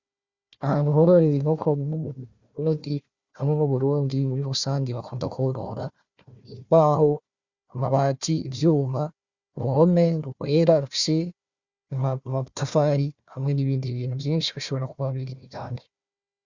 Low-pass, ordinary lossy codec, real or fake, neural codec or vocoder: 7.2 kHz; Opus, 64 kbps; fake; codec, 16 kHz, 1 kbps, FunCodec, trained on Chinese and English, 50 frames a second